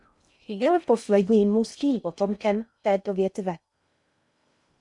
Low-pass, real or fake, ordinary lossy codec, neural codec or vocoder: 10.8 kHz; fake; MP3, 96 kbps; codec, 16 kHz in and 24 kHz out, 0.6 kbps, FocalCodec, streaming, 2048 codes